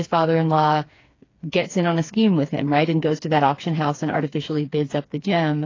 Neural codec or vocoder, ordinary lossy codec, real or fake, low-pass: codec, 16 kHz, 4 kbps, FreqCodec, smaller model; AAC, 32 kbps; fake; 7.2 kHz